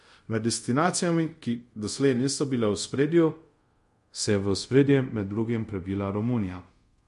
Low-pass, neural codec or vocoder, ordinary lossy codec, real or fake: 10.8 kHz; codec, 24 kHz, 0.5 kbps, DualCodec; MP3, 48 kbps; fake